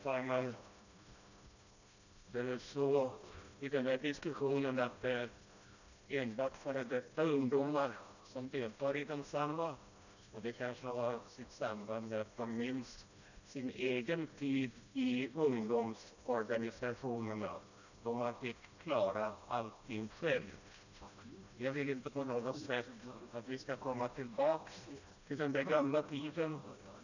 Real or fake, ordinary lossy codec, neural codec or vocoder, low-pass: fake; none; codec, 16 kHz, 1 kbps, FreqCodec, smaller model; 7.2 kHz